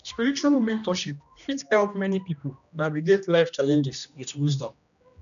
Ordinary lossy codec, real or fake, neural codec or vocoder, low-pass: none; fake; codec, 16 kHz, 1 kbps, X-Codec, HuBERT features, trained on general audio; 7.2 kHz